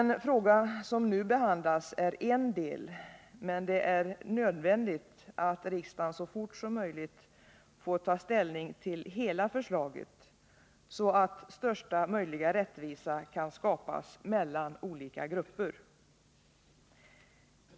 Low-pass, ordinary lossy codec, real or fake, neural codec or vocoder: none; none; real; none